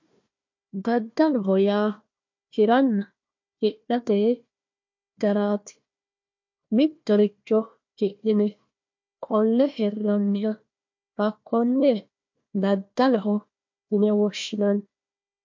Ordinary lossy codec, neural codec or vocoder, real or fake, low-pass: MP3, 48 kbps; codec, 16 kHz, 1 kbps, FunCodec, trained on Chinese and English, 50 frames a second; fake; 7.2 kHz